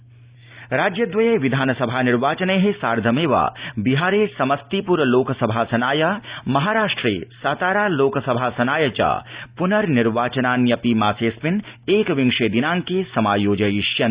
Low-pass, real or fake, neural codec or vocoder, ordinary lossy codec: 3.6 kHz; real; none; Opus, 64 kbps